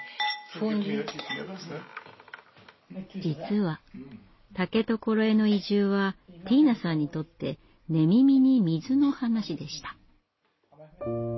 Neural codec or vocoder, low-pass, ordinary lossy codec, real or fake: none; 7.2 kHz; MP3, 24 kbps; real